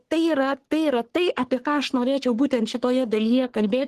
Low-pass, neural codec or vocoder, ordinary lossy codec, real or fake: 14.4 kHz; codec, 44.1 kHz, 3.4 kbps, Pupu-Codec; Opus, 16 kbps; fake